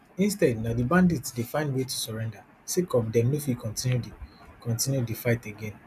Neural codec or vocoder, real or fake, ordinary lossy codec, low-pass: none; real; none; 14.4 kHz